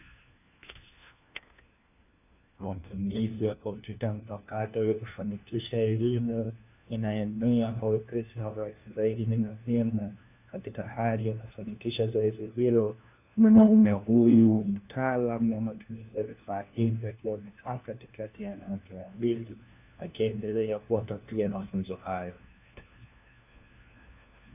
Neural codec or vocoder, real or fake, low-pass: codec, 16 kHz, 1 kbps, FunCodec, trained on LibriTTS, 50 frames a second; fake; 3.6 kHz